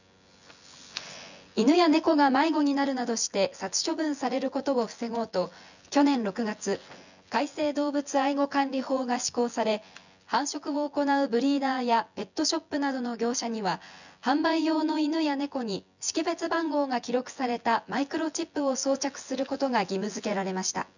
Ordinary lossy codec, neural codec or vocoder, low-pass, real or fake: none; vocoder, 24 kHz, 100 mel bands, Vocos; 7.2 kHz; fake